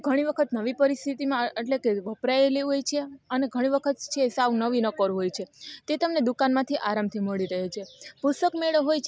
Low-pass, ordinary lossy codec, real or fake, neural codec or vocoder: none; none; real; none